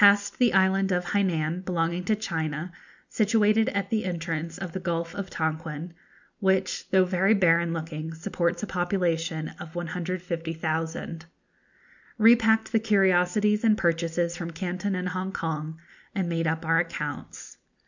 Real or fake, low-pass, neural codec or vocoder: real; 7.2 kHz; none